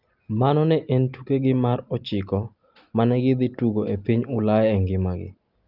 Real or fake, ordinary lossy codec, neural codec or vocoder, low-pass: real; Opus, 32 kbps; none; 5.4 kHz